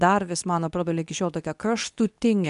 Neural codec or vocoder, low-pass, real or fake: codec, 24 kHz, 0.9 kbps, WavTokenizer, medium speech release version 2; 10.8 kHz; fake